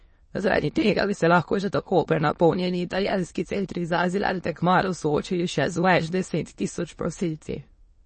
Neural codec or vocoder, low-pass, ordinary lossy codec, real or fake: autoencoder, 22.05 kHz, a latent of 192 numbers a frame, VITS, trained on many speakers; 9.9 kHz; MP3, 32 kbps; fake